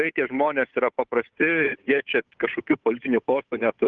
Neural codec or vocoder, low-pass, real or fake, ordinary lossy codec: codec, 16 kHz, 8 kbps, FunCodec, trained on Chinese and English, 25 frames a second; 7.2 kHz; fake; Opus, 32 kbps